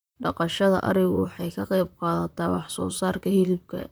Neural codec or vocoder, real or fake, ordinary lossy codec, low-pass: vocoder, 44.1 kHz, 128 mel bands, Pupu-Vocoder; fake; none; none